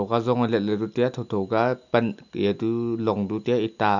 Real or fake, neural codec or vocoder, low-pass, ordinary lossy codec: real; none; 7.2 kHz; none